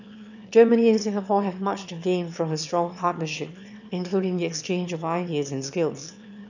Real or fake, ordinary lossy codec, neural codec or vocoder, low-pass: fake; none; autoencoder, 22.05 kHz, a latent of 192 numbers a frame, VITS, trained on one speaker; 7.2 kHz